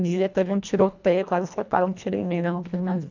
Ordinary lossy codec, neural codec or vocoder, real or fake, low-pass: none; codec, 24 kHz, 1.5 kbps, HILCodec; fake; 7.2 kHz